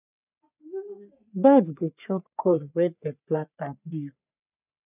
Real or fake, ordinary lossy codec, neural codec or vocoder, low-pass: fake; none; codec, 44.1 kHz, 3.4 kbps, Pupu-Codec; 3.6 kHz